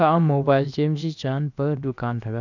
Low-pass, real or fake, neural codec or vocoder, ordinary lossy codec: 7.2 kHz; fake; codec, 16 kHz, about 1 kbps, DyCAST, with the encoder's durations; none